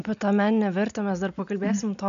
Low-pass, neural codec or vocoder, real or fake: 7.2 kHz; none; real